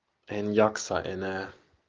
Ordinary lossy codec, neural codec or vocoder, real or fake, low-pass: Opus, 24 kbps; none; real; 7.2 kHz